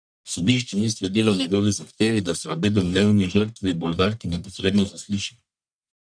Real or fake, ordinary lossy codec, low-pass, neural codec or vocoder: fake; none; 9.9 kHz; codec, 44.1 kHz, 1.7 kbps, Pupu-Codec